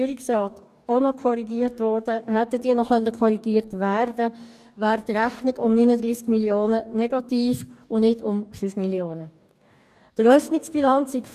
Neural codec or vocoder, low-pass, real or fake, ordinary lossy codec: codec, 44.1 kHz, 2.6 kbps, DAC; 14.4 kHz; fake; none